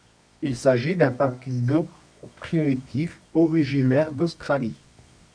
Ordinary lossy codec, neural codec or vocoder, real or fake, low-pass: MP3, 64 kbps; codec, 24 kHz, 0.9 kbps, WavTokenizer, medium music audio release; fake; 9.9 kHz